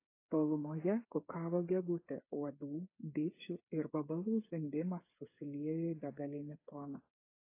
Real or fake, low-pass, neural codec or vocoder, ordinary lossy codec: fake; 3.6 kHz; codec, 16 kHz, 8 kbps, FreqCodec, smaller model; AAC, 24 kbps